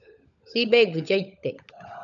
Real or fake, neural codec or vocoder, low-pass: fake; codec, 16 kHz, 16 kbps, FunCodec, trained on LibriTTS, 50 frames a second; 7.2 kHz